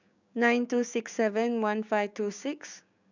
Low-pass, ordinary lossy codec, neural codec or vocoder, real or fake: 7.2 kHz; none; codec, 16 kHz, 6 kbps, DAC; fake